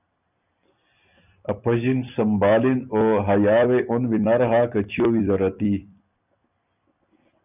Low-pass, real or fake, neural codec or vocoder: 3.6 kHz; fake; vocoder, 44.1 kHz, 128 mel bands every 256 samples, BigVGAN v2